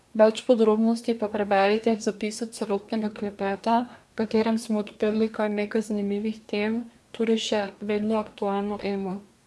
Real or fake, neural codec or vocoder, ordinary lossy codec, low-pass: fake; codec, 24 kHz, 1 kbps, SNAC; none; none